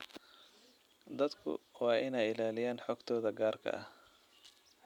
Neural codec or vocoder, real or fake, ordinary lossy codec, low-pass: none; real; MP3, 96 kbps; 19.8 kHz